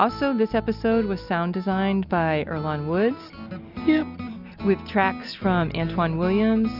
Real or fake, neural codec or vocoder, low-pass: real; none; 5.4 kHz